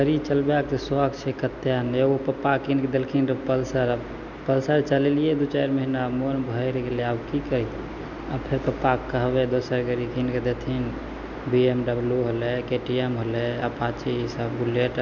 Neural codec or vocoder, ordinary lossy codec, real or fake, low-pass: none; none; real; 7.2 kHz